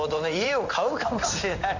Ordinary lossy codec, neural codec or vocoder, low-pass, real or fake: none; codec, 16 kHz in and 24 kHz out, 1 kbps, XY-Tokenizer; 7.2 kHz; fake